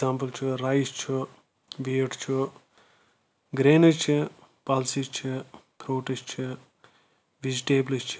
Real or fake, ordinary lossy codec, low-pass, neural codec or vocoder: real; none; none; none